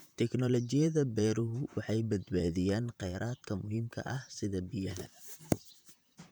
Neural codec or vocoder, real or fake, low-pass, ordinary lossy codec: vocoder, 44.1 kHz, 128 mel bands, Pupu-Vocoder; fake; none; none